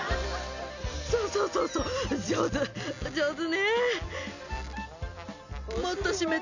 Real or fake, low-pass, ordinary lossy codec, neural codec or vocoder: real; 7.2 kHz; none; none